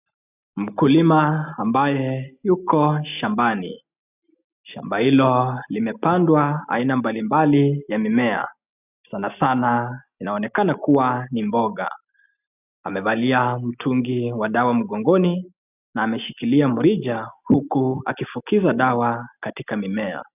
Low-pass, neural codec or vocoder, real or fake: 3.6 kHz; none; real